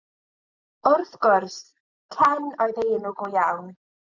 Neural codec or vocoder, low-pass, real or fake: none; 7.2 kHz; real